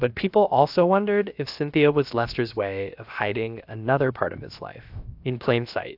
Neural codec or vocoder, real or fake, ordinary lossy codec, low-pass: codec, 16 kHz, about 1 kbps, DyCAST, with the encoder's durations; fake; AAC, 48 kbps; 5.4 kHz